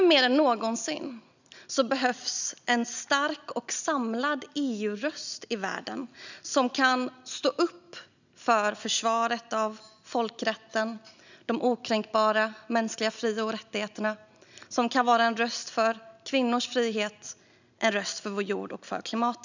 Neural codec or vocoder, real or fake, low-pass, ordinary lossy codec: none; real; 7.2 kHz; none